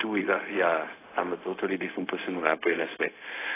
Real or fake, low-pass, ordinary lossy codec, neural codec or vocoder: fake; 3.6 kHz; AAC, 16 kbps; codec, 16 kHz, 0.4 kbps, LongCat-Audio-Codec